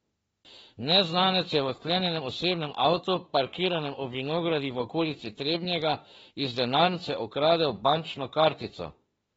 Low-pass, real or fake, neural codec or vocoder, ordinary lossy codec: 19.8 kHz; fake; autoencoder, 48 kHz, 32 numbers a frame, DAC-VAE, trained on Japanese speech; AAC, 24 kbps